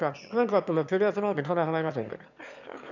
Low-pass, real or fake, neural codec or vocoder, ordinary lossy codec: 7.2 kHz; fake; autoencoder, 22.05 kHz, a latent of 192 numbers a frame, VITS, trained on one speaker; none